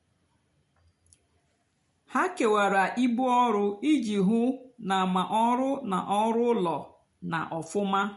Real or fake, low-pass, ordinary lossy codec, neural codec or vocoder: real; 14.4 kHz; MP3, 48 kbps; none